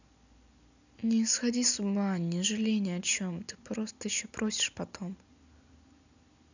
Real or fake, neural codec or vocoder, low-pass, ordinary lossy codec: real; none; 7.2 kHz; none